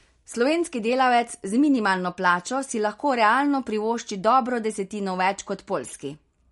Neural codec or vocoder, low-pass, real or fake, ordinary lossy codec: none; 19.8 kHz; real; MP3, 48 kbps